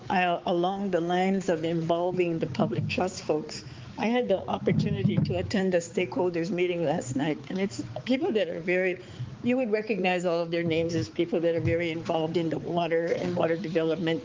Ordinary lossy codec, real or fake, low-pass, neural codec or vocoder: Opus, 32 kbps; fake; 7.2 kHz; codec, 16 kHz, 4 kbps, X-Codec, HuBERT features, trained on balanced general audio